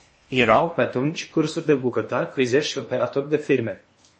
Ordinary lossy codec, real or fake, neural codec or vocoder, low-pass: MP3, 32 kbps; fake; codec, 16 kHz in and 24 kHz out, 0.8 kbps, FocalCodec, streaming, 65536 codes; 9.9 kHz